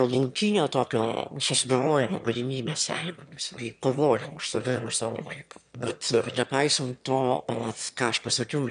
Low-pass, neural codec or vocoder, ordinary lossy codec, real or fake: 9.9 kHz; autoencoder, 22.05 kHz, a latent of 192 numbers a frame, VITS, trained on one speaker; MP3, 96 kbps; fake